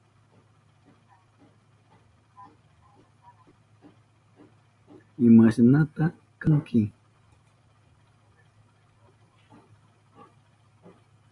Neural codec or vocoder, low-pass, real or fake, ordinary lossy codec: none; 10.8 kHz; real; MP3, 64 kbps